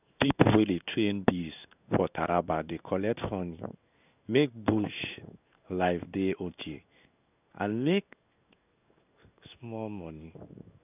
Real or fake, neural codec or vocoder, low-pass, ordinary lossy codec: fake; codec, 16 kHz in and 24 kHz out, 1 kbps, XY-Tokenizer; 3.6 kHz; none